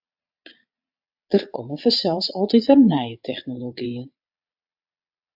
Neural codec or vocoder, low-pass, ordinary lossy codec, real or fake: vocoder, 22.05 kHz, 80 mel bands, Vocos; 5.4 kHz; AAC, 48 kbps; fake